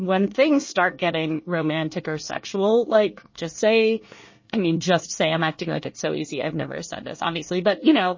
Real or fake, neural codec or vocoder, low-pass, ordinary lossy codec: fake; codec, 24 kHz, 1 kbps, SNAC; 7.2 kHz; MP3, 32 kbps